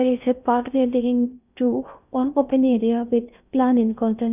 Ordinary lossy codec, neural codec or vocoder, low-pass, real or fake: none; codec, 16 kHz in and 24 kHz out, 0.6 kbps, FocalCodec, streaming, 2048 codes; 3.6 kHz; fake